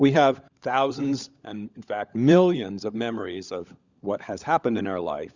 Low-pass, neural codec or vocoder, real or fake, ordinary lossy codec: 7.2 kHz; codec, 16 kHz, 16 kbps, FunCodec, trained on LibriTTS, 50 frames a second; fake; Opus, 64 kbps